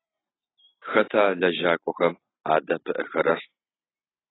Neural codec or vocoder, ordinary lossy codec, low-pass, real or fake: none; AAC, 16 kbps; 7.2 kHz; real